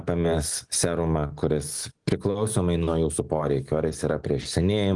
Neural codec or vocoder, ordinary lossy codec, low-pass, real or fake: none; Opus, 24 kbps; 10.8 kHz; real